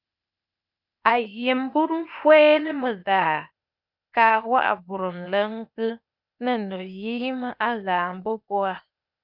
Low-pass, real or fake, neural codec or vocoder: 5.4 kHz; fake; codec, 16 kHz, 0.8 kbps, ZipCodec